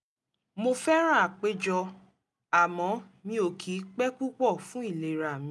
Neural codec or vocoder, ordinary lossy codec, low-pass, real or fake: none; none; none; real